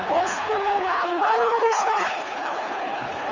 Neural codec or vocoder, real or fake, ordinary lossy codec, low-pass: codec, 24 kHz, 3 kbps, HILCodec; fake; Opus, 32 kbps; 7.2 kHz